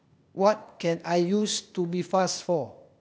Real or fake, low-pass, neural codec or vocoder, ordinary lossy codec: fake; none; codec, 16 kHz, 0.8 kbps, ZipCodec; none